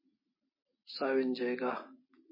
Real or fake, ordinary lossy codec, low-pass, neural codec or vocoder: real; MP3, 24 kbps; 5.4 kHz; none